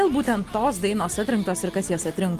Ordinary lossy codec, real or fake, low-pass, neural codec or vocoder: Opus, 24 kbps; real; 14.4 kHz; none